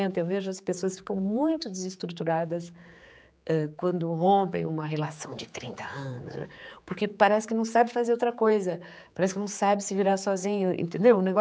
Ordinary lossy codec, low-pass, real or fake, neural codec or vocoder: none; none; fake; codec, 16 kHz, 4 kbps, X-Codec, HuBERT features, trained on general audio